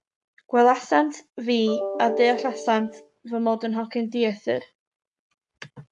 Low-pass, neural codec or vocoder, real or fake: 10.8 kHz; codec, 44.1 kHz, 7.8 kbps, Pupu-Codec; fake